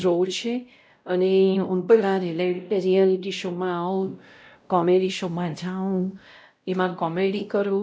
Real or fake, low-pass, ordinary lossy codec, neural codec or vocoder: fake; none; none; codec, 16 kHz, 0.5 kbps, X-Codec, WavLM features, trained on Multilingual LibriSpeech